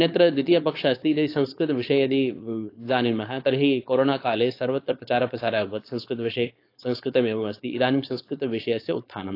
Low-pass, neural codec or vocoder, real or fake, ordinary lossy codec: 5.4 kHz; codec, 16 kHz, 4.8 kbps, FACodec; fake; AAC, 32 kbps